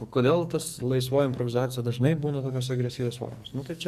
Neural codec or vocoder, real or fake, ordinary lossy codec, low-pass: codec, 44.1 kHz, 2.6 kbps, SNAC; fake; Opus, 64 kbps; 14.4 kHz